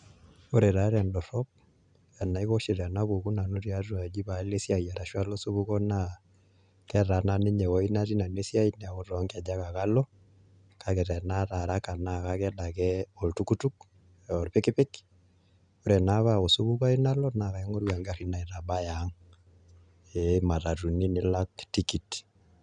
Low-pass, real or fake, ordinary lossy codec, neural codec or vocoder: 9.9 kHz; real; none; none